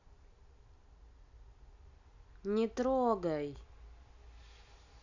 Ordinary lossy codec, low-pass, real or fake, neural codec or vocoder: none; 7.2 kHz; real; none